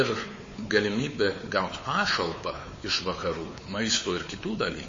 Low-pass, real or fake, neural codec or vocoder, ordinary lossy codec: 7.2 kHz; fake; codec, 16 kHz, 4 kbps, X-Codec, WavLM features, trained on Multilingual LibriSpeech; MP3, 32 kbps